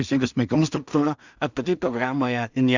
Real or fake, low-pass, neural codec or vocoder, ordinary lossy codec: fake; 7.2 kHz; codec, 16 kHz in and 24 kHz out, 0.4 kbps, LongCat-Audio-Codec, two codebook decoder; Opus, 64 kbps